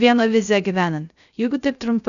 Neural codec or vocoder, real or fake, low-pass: codec, 16 kHz, 0.3 kbps, FocalCodec; fake; 7.2 kHz